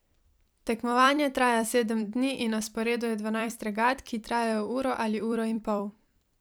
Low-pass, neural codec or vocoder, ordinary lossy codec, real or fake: none; vocoder, 44.1 kHz, 128 mel bands every 256 samples, BigVGAN v2; none; fake